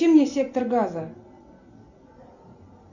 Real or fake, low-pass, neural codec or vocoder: real; 7.2 kHz; none